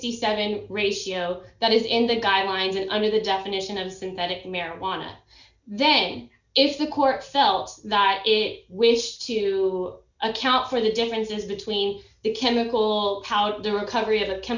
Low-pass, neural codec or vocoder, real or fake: 7.2 kHz; none; real